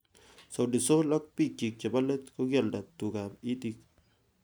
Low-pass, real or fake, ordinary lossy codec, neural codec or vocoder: none; real; none; none